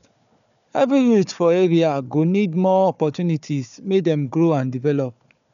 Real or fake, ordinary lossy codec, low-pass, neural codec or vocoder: fake; none; 7.2 kHz; codec, 16 kHz, 4 kbps, FunCodec, trained on Chinese and English, 50 frames a second